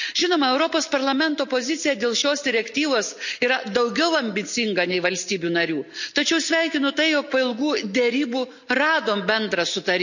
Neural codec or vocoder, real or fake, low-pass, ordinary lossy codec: none; real; 7.2 kHz; none